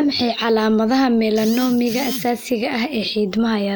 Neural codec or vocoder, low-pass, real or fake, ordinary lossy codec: none; none; real; none